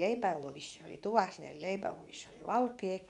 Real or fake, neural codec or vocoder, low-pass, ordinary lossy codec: fake; codec, 24 kHz, 0.9 kbps, WavTokenizer, medium speech release version 2; 10.8 kHz; none